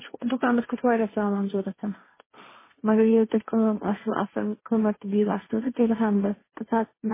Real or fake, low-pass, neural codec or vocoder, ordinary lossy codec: fake; 3.6 kHz; codec, 16 kHz, 1.1 kbps, Voila-Tokenizer; MP3, 16 kbps